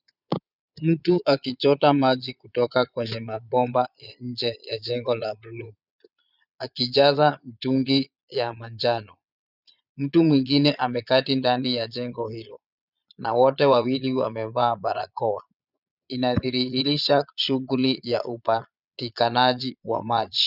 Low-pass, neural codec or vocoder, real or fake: 5.4 kHz; vocoder, 22.05 kHz, 80 mel bands, Vocos; fake